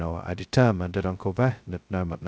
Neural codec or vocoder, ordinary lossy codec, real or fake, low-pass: codec, 16 kHz, 0.2 kbps, FocalCodec; none; fake; none